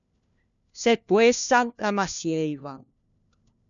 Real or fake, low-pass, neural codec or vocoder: fake; 7.2 kHz; codec, 16 kHz, 1 kbps, FunCodec, trained on LibriTTS, 50 frames a second